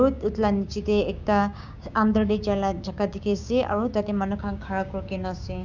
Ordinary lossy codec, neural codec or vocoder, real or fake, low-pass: none; codec, 16 kHz, 6 kbps, DAC; fake; 7.2 kHz